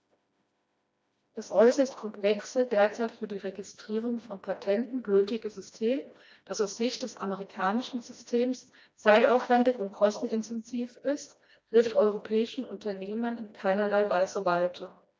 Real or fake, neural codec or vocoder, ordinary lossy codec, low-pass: fake; codec, 16 kHz, 1 kbps, FreqCodec, smaller model; none; none